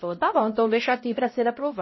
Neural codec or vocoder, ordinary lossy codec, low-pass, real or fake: codec, 16 kHz, 0.5 kbps, X-Codec, HuBERT features, trained on LibriSpeech; MP3, 24 kbps; 7.2 kHz; fake